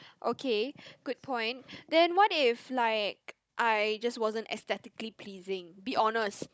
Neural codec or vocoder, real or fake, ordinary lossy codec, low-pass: codec, 16 kHz, 16 kbps, FunCodec, trained on Chinese and English, 50 frames a second; fake; none; none